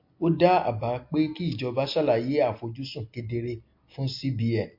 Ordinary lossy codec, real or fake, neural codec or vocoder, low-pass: MP3, 32 kbps; real; none; 5.4 kHz